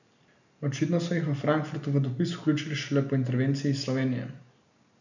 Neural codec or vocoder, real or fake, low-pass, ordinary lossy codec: none; real; 7.2 kHz; none